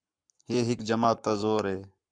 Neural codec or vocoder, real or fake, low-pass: codec, 44.1 kHz, 7.8 kbps, Pupu-Codec; fake; 9.9 kHz